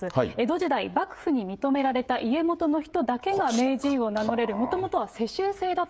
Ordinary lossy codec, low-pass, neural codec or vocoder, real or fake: none; none; codec, 16 kHz, 16 kbps, FreqCodec, smaller model; fake